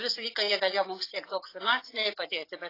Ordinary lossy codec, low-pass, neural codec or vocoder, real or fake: AAC, 24 kbps; 5.4 kHz; vocoder, 24 kHz, 100 mel bands, Vocos; fake